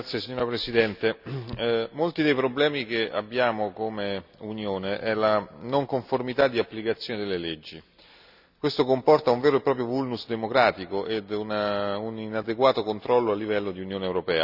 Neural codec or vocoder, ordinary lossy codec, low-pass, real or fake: none; none; 5.4 kHz; real